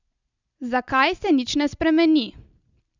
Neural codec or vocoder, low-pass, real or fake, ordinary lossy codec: none; 7.2 kHz; real; none